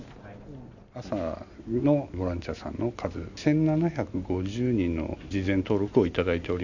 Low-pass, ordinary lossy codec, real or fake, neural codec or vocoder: 7.2 kHz; none; real; none